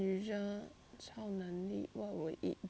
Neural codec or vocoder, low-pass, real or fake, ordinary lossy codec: none; none; real; none